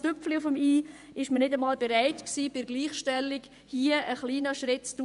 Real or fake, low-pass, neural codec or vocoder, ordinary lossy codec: fake; 10.8 kHz; vocoder, 24 kHz, 100 mel bands, Vocos; none